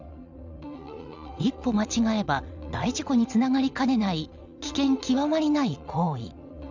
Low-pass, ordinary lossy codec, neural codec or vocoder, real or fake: 7.2 kHz; none; vocoder, 22.05 kHz, 80 mel bands, WaveNeXt; fake